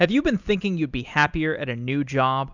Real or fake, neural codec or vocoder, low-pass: real; none; 7.2 kHz